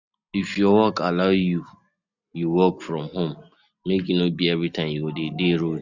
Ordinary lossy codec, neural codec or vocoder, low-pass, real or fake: none; none; 7.2 kHz; real